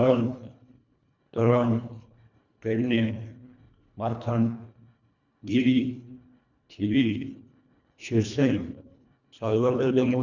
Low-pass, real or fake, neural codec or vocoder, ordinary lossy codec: 7.2 kHz; fake; codec, 24 kHz, 1.5 kbps, HILCodec; none